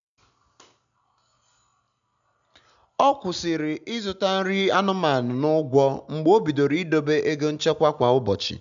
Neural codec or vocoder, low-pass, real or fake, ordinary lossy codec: none; 7.2 kHz; real; none